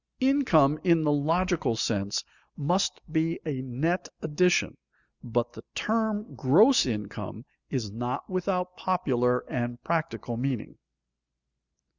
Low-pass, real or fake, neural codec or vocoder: 7.2 kHz; real; none